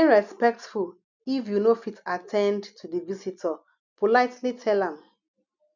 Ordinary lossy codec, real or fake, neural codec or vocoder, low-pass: none; real; none; 7.2 kHz